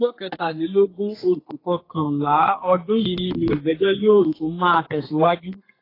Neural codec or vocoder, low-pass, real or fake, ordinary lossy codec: codec, 32 kHz, 1.9 kbps, SNAC; 5.4 kHz; fake; AAC, 24 kbps